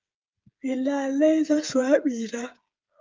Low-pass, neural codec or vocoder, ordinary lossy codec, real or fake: 7.2 kHz; codec, 16 kHz, 8 kbps, FreqCodec, smaller model; Opus, 24 kbps; fake